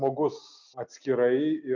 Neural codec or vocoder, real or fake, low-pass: none; real; 7.2 kHz